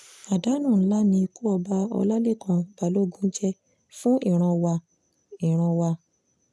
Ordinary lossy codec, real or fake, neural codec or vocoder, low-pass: none; real; none; none